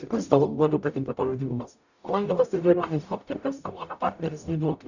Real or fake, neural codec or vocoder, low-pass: fake; codec, 44.1 kHz, 0.9 kbps, DAC; 7.2 kHz